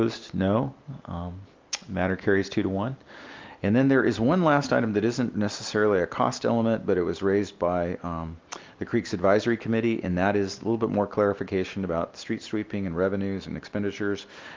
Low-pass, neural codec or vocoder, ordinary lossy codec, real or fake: 7.2 kHz; none; Opus, 24 kbps; real